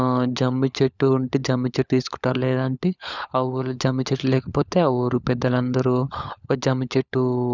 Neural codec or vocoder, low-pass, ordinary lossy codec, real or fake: codec, 16 kHz, 16 kbps, FunCodec, trained on LibriTTS, 50 frames a second; 7.2 kHz; none; fake